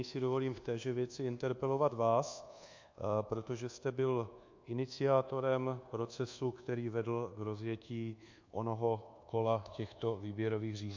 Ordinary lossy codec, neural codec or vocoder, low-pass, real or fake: MP3, 48 kbps; codec, 24 kHz, 1.2 kbps, DualCodec; 7.2 kHz; fake